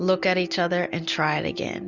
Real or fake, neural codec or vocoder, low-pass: real; none; 7.2 kHz